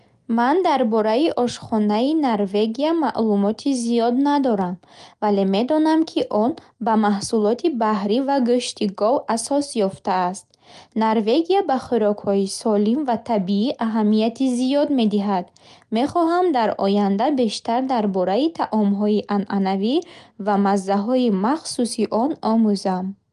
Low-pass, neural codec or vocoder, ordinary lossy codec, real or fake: 10.8 kHz; none; Opus, 32 kbps; real